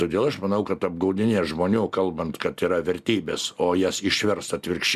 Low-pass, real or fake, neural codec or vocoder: 14.4 kHz; real; none